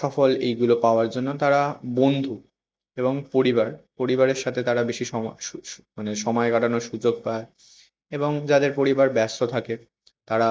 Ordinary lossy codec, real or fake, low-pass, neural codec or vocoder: none; real; none; none